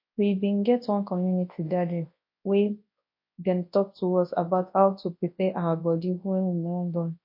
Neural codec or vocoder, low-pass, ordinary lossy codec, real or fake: codec, 24 kHz, 0.9 kbps, WavTokenizer, large speech release; 5.4 kHz; MP3, 32 kbps; fake